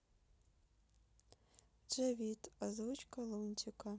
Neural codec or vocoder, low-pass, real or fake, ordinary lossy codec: none; none; real; none